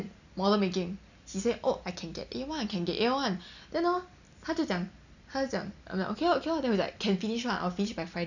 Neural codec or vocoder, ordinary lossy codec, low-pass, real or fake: none; none; 7.2 kHz; real